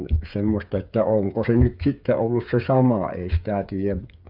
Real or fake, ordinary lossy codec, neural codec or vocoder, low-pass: fake; none; codec, 16 kHz, 8 kbps, FreqCodec, smaller model; 5.4 kHz